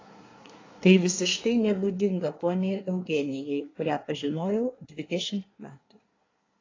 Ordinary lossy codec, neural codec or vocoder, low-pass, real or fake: AAC, 32 kbps; codec, 24 kHz, 1 kbps, SNAC; 7.2 kHz; fake